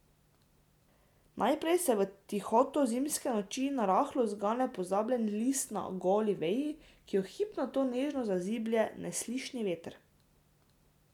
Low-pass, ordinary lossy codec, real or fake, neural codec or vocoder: 19.8 kHz; none; real; none